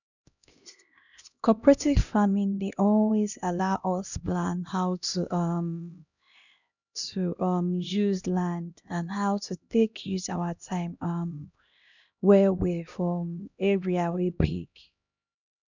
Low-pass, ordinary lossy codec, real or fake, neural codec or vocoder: 7.2 kHz; none; fake; codec, 16 kHz, 1 kbps, X-Codec, HuBERT features, trained on LibriSpeech